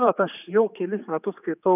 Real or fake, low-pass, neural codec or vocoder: fake; 3.6 kHz; codec, 16 kHz, 4 kbps, X-Codec, HuBERT features, trained on general audio